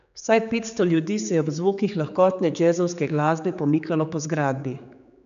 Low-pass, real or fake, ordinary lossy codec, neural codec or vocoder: 7.2 kHz; fake; none; codec, 16 kHz, 4 kbps, X-Codec, HuBERT features, trained on general audio